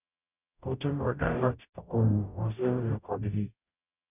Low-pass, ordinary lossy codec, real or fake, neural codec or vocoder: 3.6 kHz; none; fake; codec, 44.1 kHz, 0.9 kbps, DAC